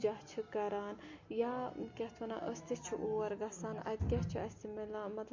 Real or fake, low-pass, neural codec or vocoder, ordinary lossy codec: real; 7.2 kHz; none; MP3, 48 kbps